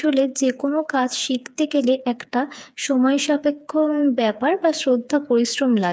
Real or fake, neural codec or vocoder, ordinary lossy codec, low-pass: fake; codec, 16 kHz, 4 kbps, FreqCodec, smaller model; none; none